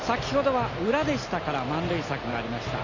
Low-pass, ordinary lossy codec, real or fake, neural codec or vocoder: 7.2 kHz; none; real; none